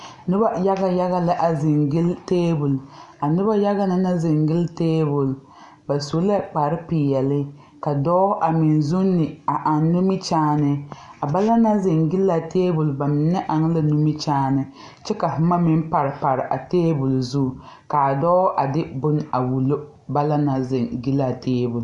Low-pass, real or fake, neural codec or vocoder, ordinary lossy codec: 10.8 kHz; real; none; MP3, 64 kbps